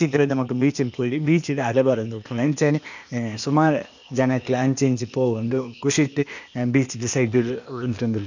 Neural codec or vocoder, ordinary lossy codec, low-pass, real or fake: codec, 16 kHz, 0.8 kbps, ZipCodec; none; 7.2 kHz; fake